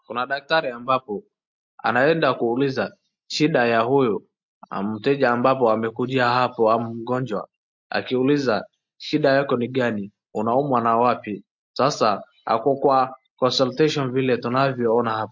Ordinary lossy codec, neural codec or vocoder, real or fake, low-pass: MP3, 48 kbps; none; real; 7.2 kHz